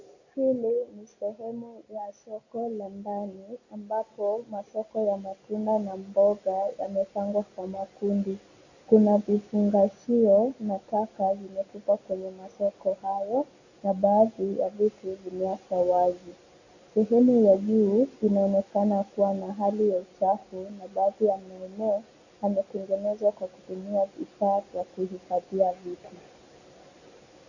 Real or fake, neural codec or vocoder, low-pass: real; none; 7.2 kHz